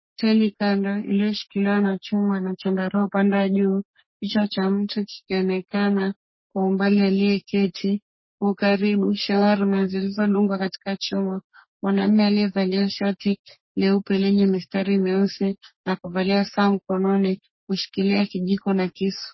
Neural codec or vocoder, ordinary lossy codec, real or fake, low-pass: codec, 44.1 kHz, 3.4 kbps, Pupu-Codec; MP3, 24 kbps; fake; 7.2 kHz